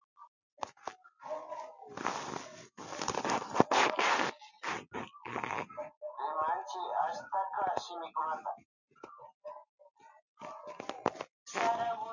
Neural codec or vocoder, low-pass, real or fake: none; 7.2 kHz; real